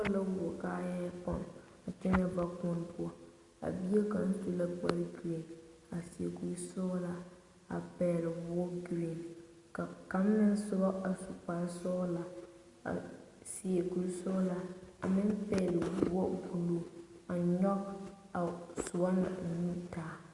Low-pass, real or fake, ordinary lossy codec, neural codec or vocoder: 10.8 kHz; real; Opus, 64 kbps; none